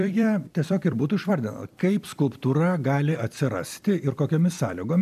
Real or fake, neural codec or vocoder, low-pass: fake; vocoder, 44.1 kHz, 128 mel bands every 256 samples, BigVGAN v2; 14.4 kHz